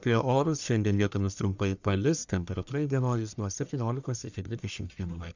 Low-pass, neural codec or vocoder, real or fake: 7.2 kHz; codec, 44.1 kHz, 1.7 kbps, Pupu-Codec; fake